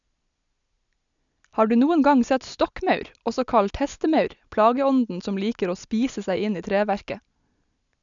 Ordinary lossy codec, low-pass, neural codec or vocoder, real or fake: none; 7.2 kHz; none; real